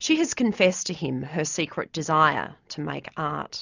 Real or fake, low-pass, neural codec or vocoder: real; 7.2 kHz; none